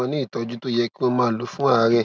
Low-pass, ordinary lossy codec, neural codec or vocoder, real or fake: none; none; none; real